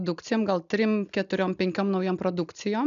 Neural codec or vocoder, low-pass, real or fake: none; 7.2 kHz; real